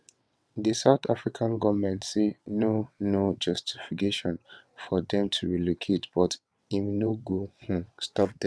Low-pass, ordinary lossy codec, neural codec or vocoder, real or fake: none; none; vocoder, 22.05 kHz, 80 mel bands, WaveNeXt; fake